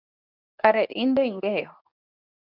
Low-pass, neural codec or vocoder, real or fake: 5.4 kHz; codec, 24 kHz, 0.9 kbps, WavTokenizer, medium speech release version 2; fake